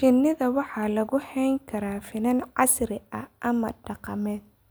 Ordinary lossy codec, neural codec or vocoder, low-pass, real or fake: none; none; none; real